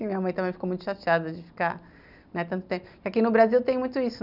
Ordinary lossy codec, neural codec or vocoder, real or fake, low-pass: none; none; real; 5.4 kHz